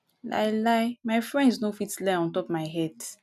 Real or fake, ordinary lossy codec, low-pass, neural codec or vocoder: real; none; 14.4 kHz; none